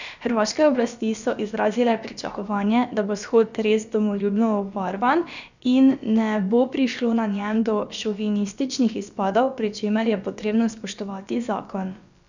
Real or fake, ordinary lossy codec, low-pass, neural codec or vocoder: fake; none; 7.2 kHz; codec, 16 kHz, about 1 kbps, DyCAST, with the encoder's durations